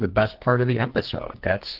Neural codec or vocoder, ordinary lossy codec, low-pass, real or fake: codec, 44.1 kHz, 2.6 kbps, SNAC; Opus, 16 kbps; 5.4 kHz; fake